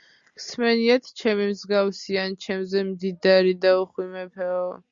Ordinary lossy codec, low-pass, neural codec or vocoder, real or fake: Opus, 64 kbps; 7.2 kHz; none; real